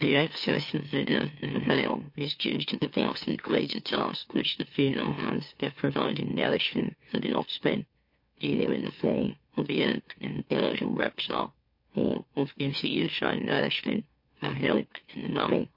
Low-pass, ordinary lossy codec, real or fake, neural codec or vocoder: 5.4 kHz; MP3, 32 kbps; fake; autoencoder, 44.1 kHz, a latent of 192 numbers a frame, MeloTTS